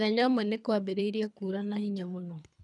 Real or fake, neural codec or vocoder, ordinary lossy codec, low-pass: fake; codec, 24 kHz, 3 kbps, HILCodec; none; 10.8 kHz